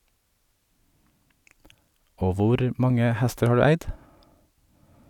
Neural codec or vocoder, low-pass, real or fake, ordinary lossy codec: none; 19.8 kHz; real; none